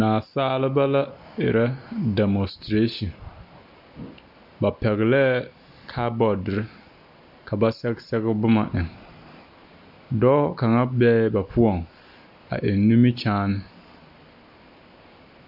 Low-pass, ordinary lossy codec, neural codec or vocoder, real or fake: 5.4 kHz; AAC, 48 kbps; none; real